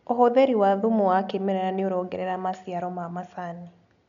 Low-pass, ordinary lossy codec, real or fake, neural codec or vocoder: 7.2 kHz; none; real; none